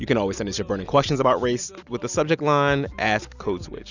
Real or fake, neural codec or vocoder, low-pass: real; none; 7.2 kHz